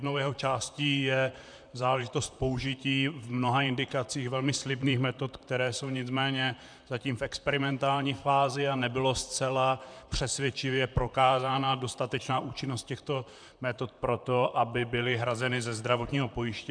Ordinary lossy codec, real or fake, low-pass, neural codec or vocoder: MP3, 96 kbps; fake; 9.9 kHz; vocoder, 44.1 kHz, 128 mel bands, Pupu-Vocoder